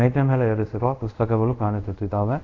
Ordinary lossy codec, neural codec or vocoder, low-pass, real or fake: AAC, 48 kbps; codec, 24 kHz, 0.5 kbps, DualCodec; 7.2 kHz; fake